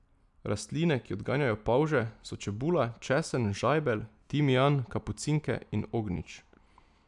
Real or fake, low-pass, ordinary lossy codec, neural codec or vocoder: real; 10.8 kHz; none; none